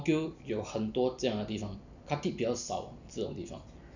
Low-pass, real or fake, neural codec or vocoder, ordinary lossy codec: 7.2 kHz; real; none; none